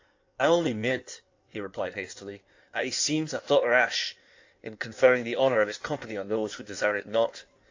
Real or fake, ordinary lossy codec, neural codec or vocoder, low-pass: fake; AAC, 48 kbps; codec, 16 kHz in and 24 kHz out, 1.1 kbps, FireRedTTS-2 codec; 7.2 kHz